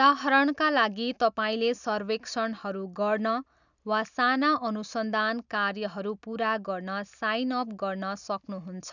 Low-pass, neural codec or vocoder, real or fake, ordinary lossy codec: 7.2 kHz; none; real; none